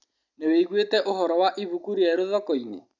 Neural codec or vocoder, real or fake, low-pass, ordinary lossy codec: none; real; 7.2 kHz; none